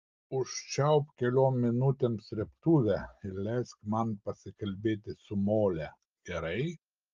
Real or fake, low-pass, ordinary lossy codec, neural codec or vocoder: real; 7.2 kHz; Opus, 32 kbps; none